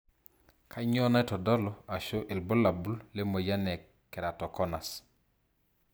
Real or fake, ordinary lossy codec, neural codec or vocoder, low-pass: real; none; none; none